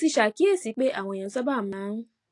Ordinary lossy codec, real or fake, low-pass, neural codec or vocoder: AAC, 48 kbps; real; 10.8 kHz; none